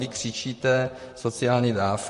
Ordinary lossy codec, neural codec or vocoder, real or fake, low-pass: MP3, 48 kbps; vocoder, 44.1 kHz, 128 mel bands, Pupu-Vocoder; fake; 14.4 kHz